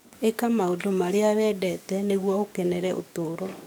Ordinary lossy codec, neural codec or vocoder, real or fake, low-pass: none; codec, 44.1 kHz, 7.8 kbps, DAC; fake; none